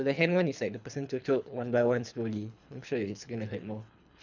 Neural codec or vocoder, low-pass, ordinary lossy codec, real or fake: codec, 24 kHz, 3 kbps, HILCodec; 7.2 kHz; none; fake